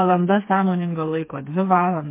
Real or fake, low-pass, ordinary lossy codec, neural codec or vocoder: fake; 3.6 kHz; MP3, 24 kbps; codec, 16 kHz, 4 kbps, FreqCodec, smaller model